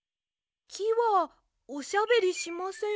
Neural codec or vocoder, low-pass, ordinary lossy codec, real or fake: none; none; none; real